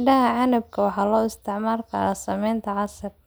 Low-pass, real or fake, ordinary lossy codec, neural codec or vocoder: none; real; none; none